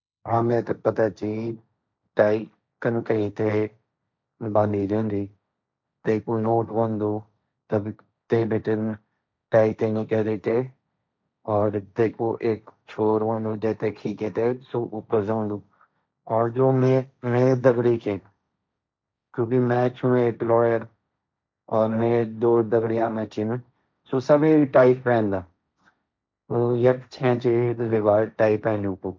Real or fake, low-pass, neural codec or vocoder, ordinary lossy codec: fake; none; codec, 16 kHz, 1.1 kbps, Voila-Tokenizer; none